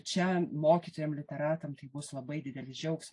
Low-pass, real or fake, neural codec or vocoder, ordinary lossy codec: 10.8 kHz; real; none; AAC, 48 kbps